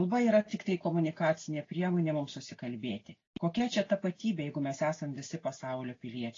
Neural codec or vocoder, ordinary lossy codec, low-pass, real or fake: none; AAC, 32 kbps; 7.2 kHz; real